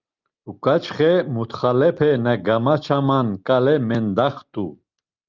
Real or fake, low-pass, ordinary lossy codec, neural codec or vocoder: real; 7.2 kHz; Opus, 32 kbps; none